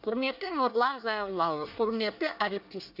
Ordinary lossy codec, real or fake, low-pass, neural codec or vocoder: none; fake; 5.4 kHz; codec, 24 kHz, 1 kbps, SNAC